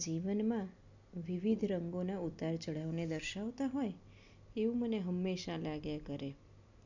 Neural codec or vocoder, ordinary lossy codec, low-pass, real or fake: none; none; 7.2 kHz; real